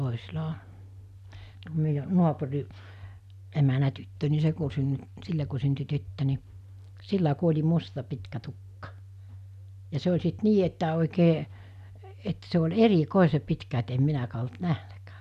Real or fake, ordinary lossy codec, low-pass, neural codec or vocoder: real; none; 14.4 kHz; none